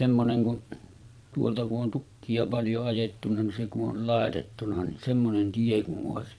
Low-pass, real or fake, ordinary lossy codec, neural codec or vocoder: none; fake; none; vocoder, 22.05 kHz, 80 mel bands, Vocos